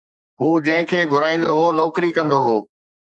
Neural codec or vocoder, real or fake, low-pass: codec, 32 kHz, 1.9 kbps, SNAC; fake; 10.8 kHz